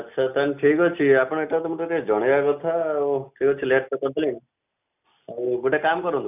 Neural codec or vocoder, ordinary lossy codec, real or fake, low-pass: none; none; real; 3.6 kHz